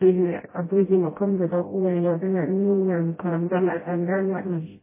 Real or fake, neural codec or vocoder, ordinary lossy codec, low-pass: fake; codec, 16 kHz, 0.5 kbps, FreqCodec, smaller model; MP3, 16 kbps; 3.6 kHz